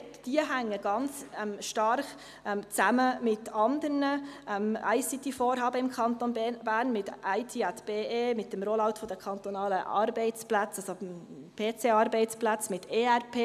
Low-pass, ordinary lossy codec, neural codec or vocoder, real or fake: 14.4 kHz; none; none; real